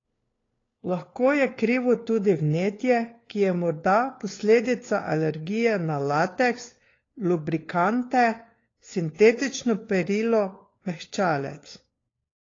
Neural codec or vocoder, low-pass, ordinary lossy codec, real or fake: codec, 16 kHz, 4 kbps, FunCodec, trained on LibriTTS, 50 frames a second; 7.2 kHz; AAC, 32 kbps; fake